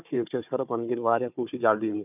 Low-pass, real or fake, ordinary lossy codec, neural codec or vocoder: 3.6 kHz; fake; none; codec, 16 kHz, 4 kbps, FunCodec, trained on Chinese and English, 50 frames a second